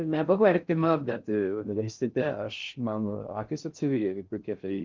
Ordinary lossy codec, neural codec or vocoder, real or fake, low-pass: Opus, 32 kbps; codec, 16 kHz in and 24 kHz out, 0.6 kbps, FocalCodec, streaming, 4096 codes; fake; 7.2 kHz